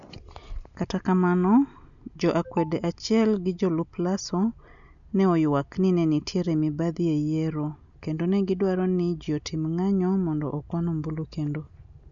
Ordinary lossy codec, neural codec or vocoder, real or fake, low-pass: none; none; real; 7.2 kHz